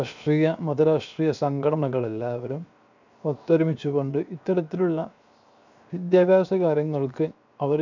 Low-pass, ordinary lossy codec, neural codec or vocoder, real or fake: 7.2 kHz; none; codec, 16 kHz, 0.7 kbps, FocalCodec; fake